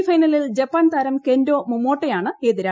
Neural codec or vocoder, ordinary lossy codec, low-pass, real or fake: none; none; none; real